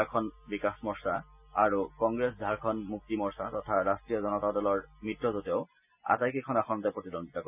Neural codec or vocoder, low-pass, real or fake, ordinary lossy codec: none; 3.6 kHz; real; none